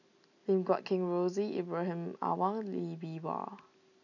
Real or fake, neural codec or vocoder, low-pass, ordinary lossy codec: real; none; 7.2 kHz; none